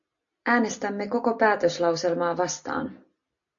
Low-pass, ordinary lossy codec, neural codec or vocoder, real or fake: 7.2 kHz; AAC, 64 kbps; none; real